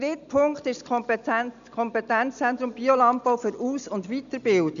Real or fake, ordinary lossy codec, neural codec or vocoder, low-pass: real; none; none; 7.2 kHz